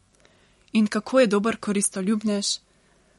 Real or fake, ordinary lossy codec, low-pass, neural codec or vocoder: real; MP3, 48 kbps; 19.8 kHz; none